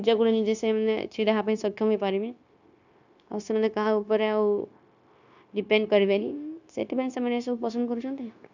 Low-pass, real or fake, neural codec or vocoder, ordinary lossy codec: 7.2 kHz; fake; codec, 16 kHz, 0.9 kbps, LongCat-Audio-Codec; none